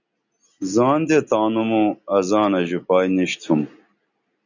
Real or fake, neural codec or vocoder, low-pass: real; none; 7.2 kHz